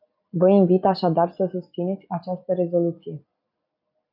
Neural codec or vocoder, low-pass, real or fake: none; 5.4 kHz; real